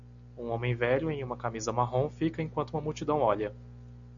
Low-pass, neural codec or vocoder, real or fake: 7.2 kHz; none; real